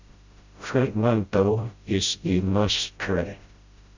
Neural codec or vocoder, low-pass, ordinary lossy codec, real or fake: codec, 16 kHz, 0.5 kbps, FreqCodec, smaller model; 7.2 kHz; Opus, 64 kbps; fake